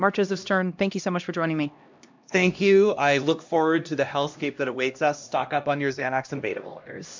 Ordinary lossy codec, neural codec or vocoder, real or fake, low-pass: MP3, 64 kbps; codec, 16 kHz, 1 kbps, X-Codec, HuBERT features, trained on LibriSpeech; fake; 7.2 kHz